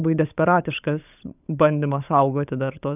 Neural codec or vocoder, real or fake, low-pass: codec, 16 kHz, 16 kbps, FunCodec, trained on LibriTTS, 50 frames a second; fake; 3.6 kHz